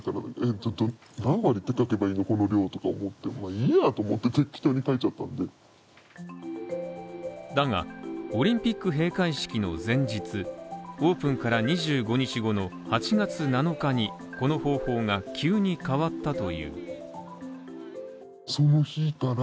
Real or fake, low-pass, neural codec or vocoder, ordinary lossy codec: real; none; none; none